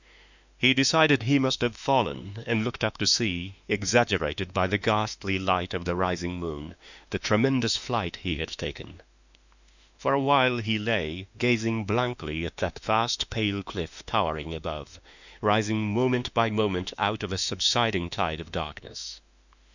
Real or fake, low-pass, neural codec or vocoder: fake; 7.2 kHz; autoencoder, 48 kHz, 32 numbers a frame, DAC-VAE, trained on Japanese speech